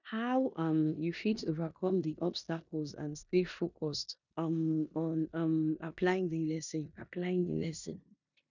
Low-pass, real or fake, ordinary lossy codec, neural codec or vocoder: 7.2 kHz; fake; none; codec, 16 kHz in and 24 kHz out, 0.9 kbps, LongCat-Audio-Codec, four codebook decoder